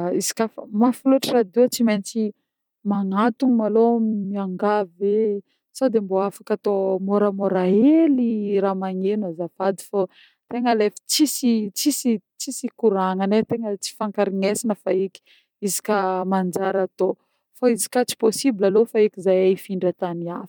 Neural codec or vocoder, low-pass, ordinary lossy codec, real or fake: vocoder, 44.1 kHz, 128 mel bands every 256 samples, BigVGAN v2; 19.8 kHz; none; fake